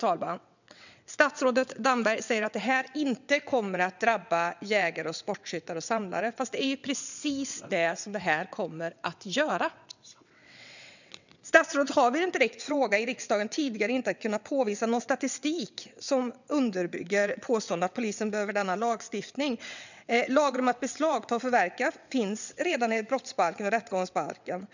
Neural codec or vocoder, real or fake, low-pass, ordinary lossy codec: vocoder, 22.05 kHz, 80 mel bands, WaveNeXt; fake; 7.2 kHz; none